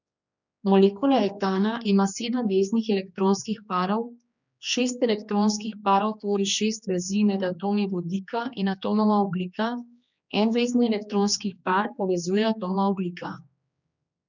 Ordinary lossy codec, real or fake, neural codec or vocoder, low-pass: none; fake; codec, 16 kHz, 2 kbps, X-Codec, HuBERT features, trained on general audio; 7.2 kHz